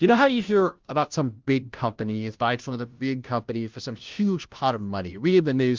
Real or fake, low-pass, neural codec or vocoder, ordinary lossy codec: fake; 7.2 kHz; codec, 16 kHz, 0.5 kbps, FunCodec, trained on Chinese and English, 25 frames a second; Opus, 32 kbps